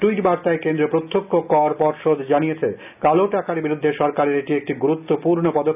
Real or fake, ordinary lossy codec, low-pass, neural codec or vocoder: real; none; 3.6 kHz; none